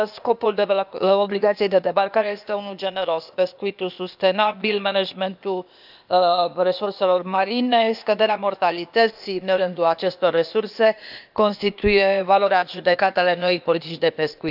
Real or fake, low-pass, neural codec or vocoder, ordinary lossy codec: fake; 5.4 kHz; codec, 16 kHz, 0.8 kbps, ZipCodec; none